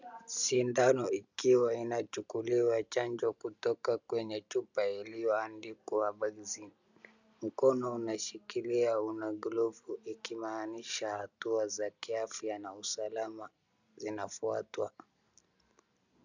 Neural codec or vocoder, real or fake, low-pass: none; real; 7.2 kHz